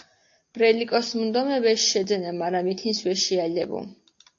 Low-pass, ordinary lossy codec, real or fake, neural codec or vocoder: 7.2 kHz; AAC, 48 kbps; real; none